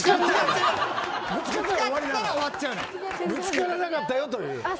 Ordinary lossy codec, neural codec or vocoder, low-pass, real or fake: none; none; none; real